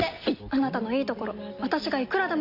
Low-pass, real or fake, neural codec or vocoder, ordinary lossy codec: 5.4 kHz; real; none; none